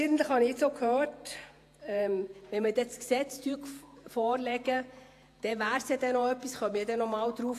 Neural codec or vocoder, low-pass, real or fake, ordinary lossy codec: vocoder, 48 kHz, 128 mel bands, Vocos; 14.4 kHz; fake; AAC, 64 kbps